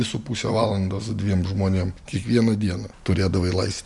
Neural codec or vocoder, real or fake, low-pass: none; real; 10.8 kHz